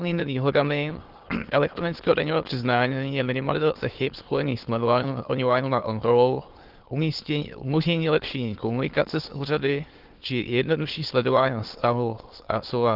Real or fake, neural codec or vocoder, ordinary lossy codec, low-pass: fake; autoencoder, 22.05 kHz, a latent of 192 numbers a frame, VITS, trained on many speakers; Opus, 16 kbps; 5.4 kHz